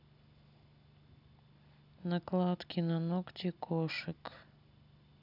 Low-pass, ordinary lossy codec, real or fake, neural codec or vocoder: 5.4 kHz; none; real; none